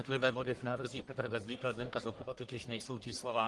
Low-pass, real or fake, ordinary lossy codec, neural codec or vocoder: 10.8 kHz; fake; Opus, 24 kbps; codec, 44.1 kHz, 1.7 kbps, Pupu-Codec